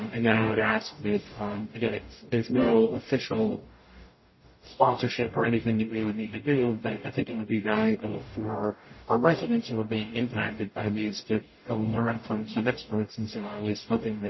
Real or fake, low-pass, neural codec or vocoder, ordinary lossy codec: fake; 7.2 kHz; codec, 44.1 kHz, 0.9 kbps, DAC; MP3, 24 kbps